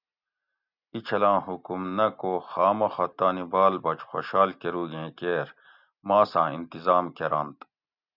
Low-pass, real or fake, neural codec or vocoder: 5.4 kHz; real; none